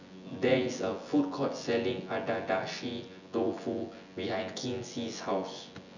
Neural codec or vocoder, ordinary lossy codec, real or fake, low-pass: vocoder, 24 kHz, 100 mel bands, Vocos; none; fake; 7.2 kHz